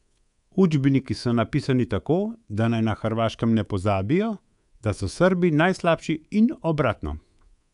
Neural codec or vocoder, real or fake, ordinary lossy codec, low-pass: codec, 24 kHz, 3.1 kbps, DualCodec; fake; none; 10.8 kHz